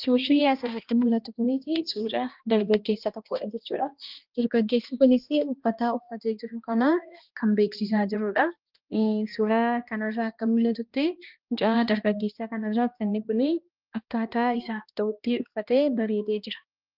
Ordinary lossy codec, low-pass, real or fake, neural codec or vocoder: Opus, 24 kbps; 5.4 kHz; fake; codec, 16 kHz, 1 kbps, X-Codec, HuBERT features, trained on balanced general audio